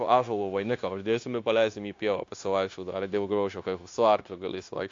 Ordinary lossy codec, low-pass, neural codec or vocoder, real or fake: AAC, 48 kbps; 7.2 kHz; codec, 16 kHz, 0.9 kbps, LongCat-Audio-Codec; fake